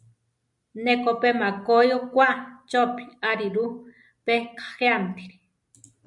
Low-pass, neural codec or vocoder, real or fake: 10.8 kHz; none; real